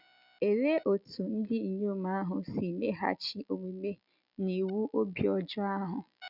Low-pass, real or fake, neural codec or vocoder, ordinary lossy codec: 5.4 kHz; real; none; none